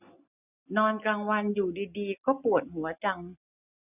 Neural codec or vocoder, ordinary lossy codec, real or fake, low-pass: none; none; real; 3.6 kHz